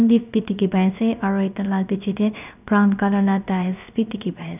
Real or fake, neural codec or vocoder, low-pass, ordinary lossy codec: fake; codec, 16 kHz, 0.3 kbps, FocalCodec; 3.6 kHz; none